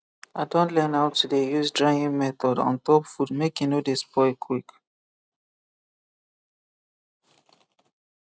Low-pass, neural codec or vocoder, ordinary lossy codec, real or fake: none; none; none; real